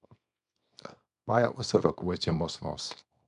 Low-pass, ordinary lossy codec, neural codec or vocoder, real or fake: 10.8 kHz; none; codec, 24 kHz, 0.9 kbps, WavTokenizer, small release; fake